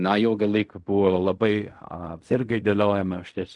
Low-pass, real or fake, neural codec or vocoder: 10.8 kHz; fake; codec, 16 kHz in and 24 kHz out, 0.4 kbps, LongCat-Audio-Codec, fine tuned four codebook decoder